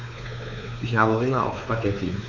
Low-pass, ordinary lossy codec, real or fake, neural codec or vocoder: 7.2 kHz; none; fake; codec, 16 kHz, 4 kbps, X-Codec, WavLM features, trained on Multilingual LibriSpeech